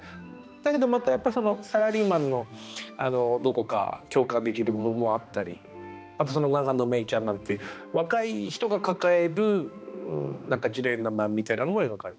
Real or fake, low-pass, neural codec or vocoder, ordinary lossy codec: fake; none; codec, 16 kHz, 2 kbps, X-Codec, HuBERT features, trained on balanced general audio; none